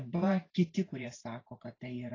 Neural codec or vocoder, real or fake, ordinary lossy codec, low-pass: vocoder, 44.1 kHz, 128 mel bands every 512 samples, BigVGAN v2; fake; AAC, 32 kbps; 7.2 kHz